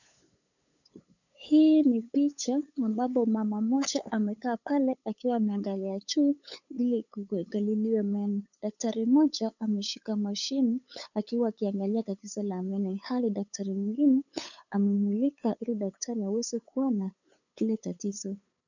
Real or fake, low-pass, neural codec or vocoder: fake; 7.2 kHz; codec, 16 kHz, 8 kbps, FunCodec, trained on LibriTTS, 25 frames a second